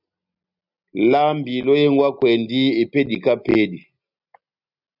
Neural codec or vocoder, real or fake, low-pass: none; real; 5.4 kHz